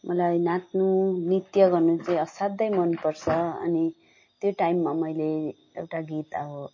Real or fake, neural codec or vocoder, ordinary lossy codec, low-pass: real; none; MP3, 32 kbps; 7.2 kHz